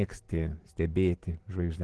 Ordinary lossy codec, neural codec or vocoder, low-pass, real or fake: Opus, 16 kbps; none; 10.8 kHz; real